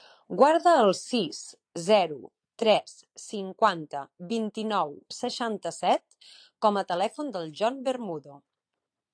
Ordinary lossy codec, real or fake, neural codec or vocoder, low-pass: AAC, 64 kbps; fake; vocoder, 22.05 kHz, 80 mel bands, Vocos; 9.9 kHz